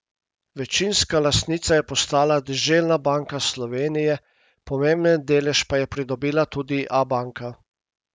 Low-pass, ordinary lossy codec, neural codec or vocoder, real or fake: none; none; none; real